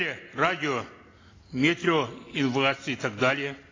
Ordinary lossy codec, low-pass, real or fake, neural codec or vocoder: AAC, 32 kbps; 7.2 kHz; real; none